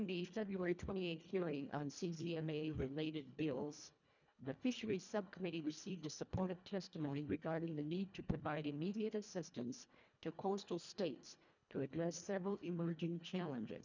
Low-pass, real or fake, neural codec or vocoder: 7.2 kHz; fake; codec, 24 kHz, 1.5 kbps, HILCodec